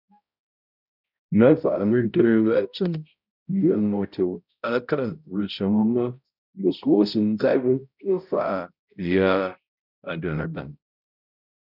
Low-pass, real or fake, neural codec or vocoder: 5.4 kHz; fake; codec, 16 kHz, 0.5 kbps, X-Codec, HuBERT features, trained on balanced general audio